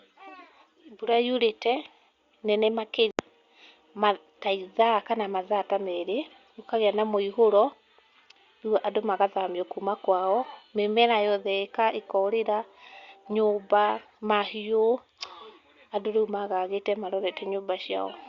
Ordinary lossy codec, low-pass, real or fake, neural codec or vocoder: Opus, 64 kbps; 7.2 kHz; real; none